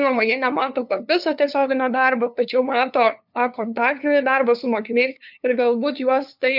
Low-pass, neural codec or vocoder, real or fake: 5.4 kHz; codec, 16 kHz, 2 kbps, FunCodec, trained on LibriTTS, 25 frames a second; fake